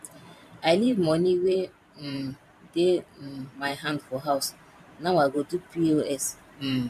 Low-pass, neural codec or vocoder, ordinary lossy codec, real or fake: 14.4 kHz; vocoder, 44.1 kHz, 128 mel bands every 512 samples, BigVGAN v2; none; fake